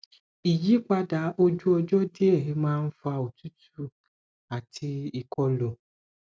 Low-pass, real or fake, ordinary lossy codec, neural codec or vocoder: none; real; none; none